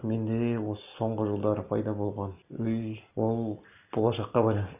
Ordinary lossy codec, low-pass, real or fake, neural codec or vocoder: none; 3.6 kHz; real; none